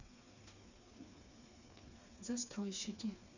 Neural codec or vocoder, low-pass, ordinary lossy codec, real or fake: codec, 16 kHz, 4 kbps, FreqCodec, smaller model; 7.2 kHz; none; fake